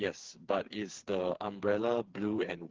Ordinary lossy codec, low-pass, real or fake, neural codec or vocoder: Opus, 16 kbps; 7.2 kHz; fake; codec, 16 kHz, 4 kbps, FreqCodec, smaller model